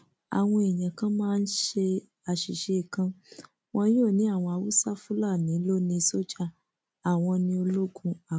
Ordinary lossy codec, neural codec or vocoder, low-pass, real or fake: none; none; none; real